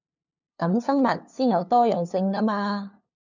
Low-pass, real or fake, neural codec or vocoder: 7.2 kHz; fake; codec, 16 kHz, 2 kbps, FunCodec, trained on LibriTTS, 25 frames a second